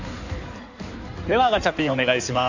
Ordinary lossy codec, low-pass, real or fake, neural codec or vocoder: none; 7.2 kHz; fake; codec, 16 kHz in and 24 kHz out, 2.2 kbps, FireRedTTS-2 codec